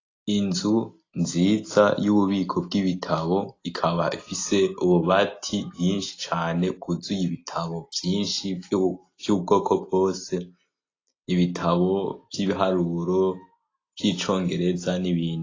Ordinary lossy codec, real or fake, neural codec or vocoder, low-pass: AAC, 32 kbps; real; none; 7.2 kHz